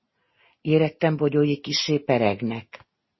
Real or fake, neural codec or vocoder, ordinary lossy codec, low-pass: real; none; MP3, 24 kbps; 7.2 kHz